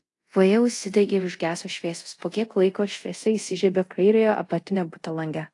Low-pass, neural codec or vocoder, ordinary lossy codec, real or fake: 10.8 kHz; codec, 24 kHz, 0.5 kbps, DualCodec; AAC, 48 kbps; fake